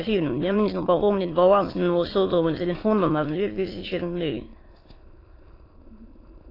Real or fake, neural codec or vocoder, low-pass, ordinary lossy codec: fake; autoencoder, 22.05 kHz, a latent of 192 numbers a frame, VITS, trained on many speakers; 5.4 kHz; AAC, 24 kbps